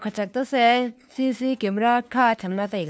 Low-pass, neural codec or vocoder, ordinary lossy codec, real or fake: none; codec, 16 kHz, 2 kbps, FunCodec, trained on LibriTTS, 25 frames a second; none; fake